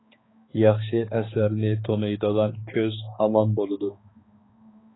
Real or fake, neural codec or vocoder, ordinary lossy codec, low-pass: fake; codec, 16 kHz, 2 kbps, X-Codec, HuBERT features, trained on balanced general audio; AAC, 16 kbps; 7.2 kHz